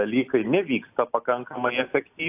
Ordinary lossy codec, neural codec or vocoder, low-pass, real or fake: AAC, 32 kbps; none; 3.6 kHz; real